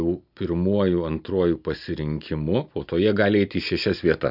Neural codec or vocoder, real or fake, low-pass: none; real; 5.4 kHz